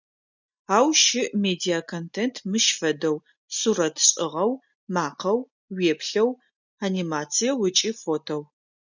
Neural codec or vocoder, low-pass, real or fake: none; 7.2 kHz; real